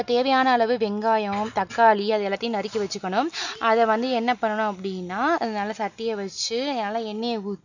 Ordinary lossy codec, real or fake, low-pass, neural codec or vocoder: none; real; 7.2 kHz; none